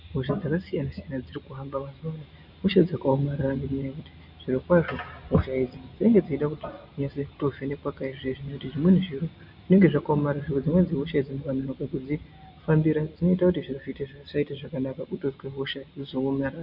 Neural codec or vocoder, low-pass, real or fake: none; 5.4 kHz; real